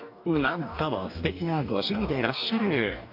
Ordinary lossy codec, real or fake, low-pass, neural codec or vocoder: AAC, 48 kbps; fake; 5.4 kHz; codec, 44.1 kHz, 2.6 kbps, DAC